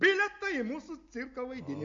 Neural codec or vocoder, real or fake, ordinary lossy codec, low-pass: none; real; MP3, 48 kbps; 7.2 kHz